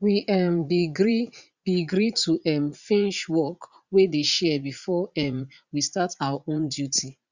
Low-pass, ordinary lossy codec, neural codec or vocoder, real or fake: 7.2 kHz; Opus, 64 kbps; vocoder, 44.1 kHz, 80 mel bands, Vocos; fake